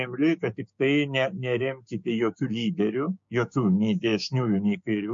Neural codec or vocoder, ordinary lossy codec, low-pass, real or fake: codec, 16 kHz, 6 kbps, DAC; MP3, 48 kbps; 7.2 kHz; fake